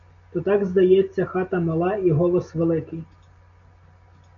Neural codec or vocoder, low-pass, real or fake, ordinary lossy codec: none; 7.2 kHz; real; AAC, 64 kbps